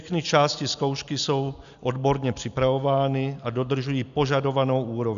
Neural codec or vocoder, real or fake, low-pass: none; real; 7.2 kHz